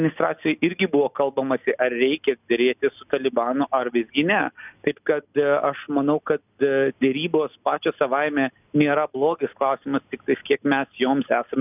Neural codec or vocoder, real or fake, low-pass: none; real; 3.6 kHz